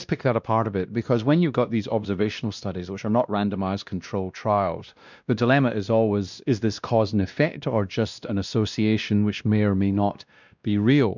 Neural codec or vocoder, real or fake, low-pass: codec, 16 kHz, 1 kbps, X-Codec, WavLM features, trained on Multilingual LibriSpeech; fake; 7.2 kHz